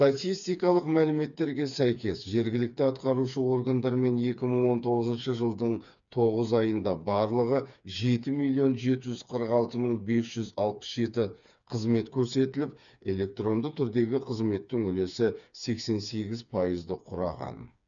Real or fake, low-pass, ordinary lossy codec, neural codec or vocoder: fake; 7.2 kHz; none; codec, 16 kHz, 4 kbps, FreqCodec, smaller model